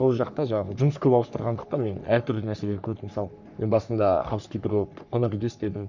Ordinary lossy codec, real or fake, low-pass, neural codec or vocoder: none; fake; 7.2 kHz; codec, 44.1 kHz, 3.4 kbps, Pupu-Codec